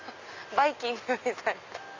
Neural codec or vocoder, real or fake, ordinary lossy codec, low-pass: none; real; AAC, 48 kbps; 7.2 kHz